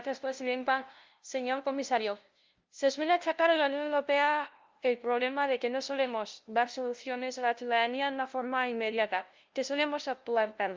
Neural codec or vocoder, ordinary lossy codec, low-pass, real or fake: codec, 16 kHz, 0.5 kbps, FunCodec, trained on LibriTTS, 25 frames a second; Opus, 32 kbps; 7.2 kHz; fake